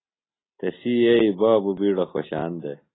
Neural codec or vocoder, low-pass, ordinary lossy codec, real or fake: none; 7.2 kHz; AAC, 16 kbps; real